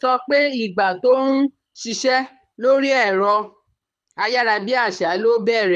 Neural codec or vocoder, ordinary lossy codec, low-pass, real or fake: codec, 24 kHz, 6 kbps, HILCodec; none; none; fake